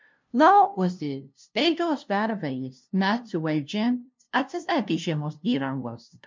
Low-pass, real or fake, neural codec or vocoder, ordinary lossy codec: 7.2 kHz; fake; codec, 16 kHz, 0.5 kbps, FunCodec, trained on LibriTTS, 25 frames a second; MP3, 64 kbps